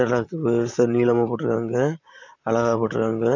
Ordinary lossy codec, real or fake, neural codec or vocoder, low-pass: none; fake; vocoder, 44.1 kHz, 128 mel bands, Pupu-Vocoder; 7.2 kHz